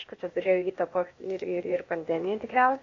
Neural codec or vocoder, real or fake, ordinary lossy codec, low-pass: codec, 16 kHz, 0.8 kbps, ZipCodec; fake; AAC, 32 kbps; 7.2 kHz